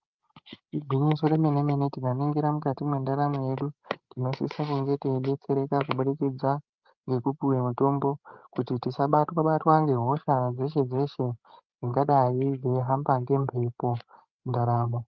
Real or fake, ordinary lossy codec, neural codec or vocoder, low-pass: fake; Opus, 32 kbps; codec, 16 kHz, 16 kbps, FreqCodec, larger model; 7.2 kHz